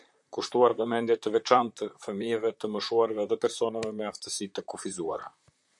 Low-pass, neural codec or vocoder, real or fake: 10.8 kHz; vocoder, 44.1 kHz, 128 mel bands, Pupu-Vocoder; fake